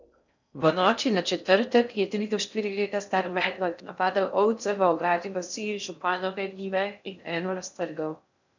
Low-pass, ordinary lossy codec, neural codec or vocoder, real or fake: 7.2 kHz; none; codec, 16 kHz in and 24 kHz out, 0.6 kbps, FocalCodec, streaming, 4096 codes; fake